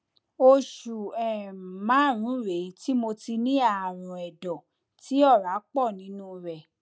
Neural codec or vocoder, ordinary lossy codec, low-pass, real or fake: none; none; none; real